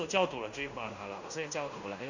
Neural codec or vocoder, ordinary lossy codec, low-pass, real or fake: codec, 16 kHz in and 24 kHz out, 0.9 kbps, LongCat-Audio-Codec, fine tuned four codebook decoder; none; 7.2 kHz; fake